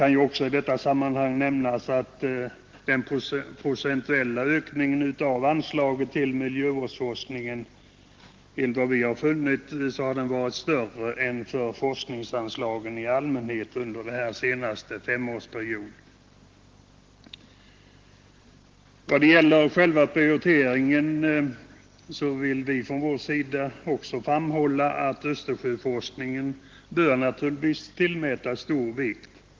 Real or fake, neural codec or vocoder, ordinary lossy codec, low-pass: real; none; Opus, 16 kbps; 7.2 kHz